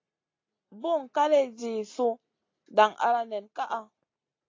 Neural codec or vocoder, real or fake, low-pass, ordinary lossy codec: none; real; 7.2 kHz; AAC, 32 kbps